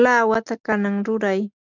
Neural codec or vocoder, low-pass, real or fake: none; 7.2 kHz; real